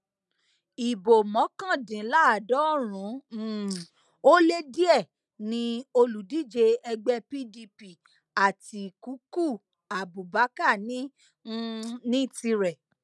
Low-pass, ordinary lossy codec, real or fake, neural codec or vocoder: none; none; real; none